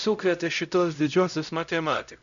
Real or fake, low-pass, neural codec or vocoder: fake; 7.2 kHz; codec, 16 kHz, 0.5 kbps, X-Codec, HuBERT features, trained on LibriSpeech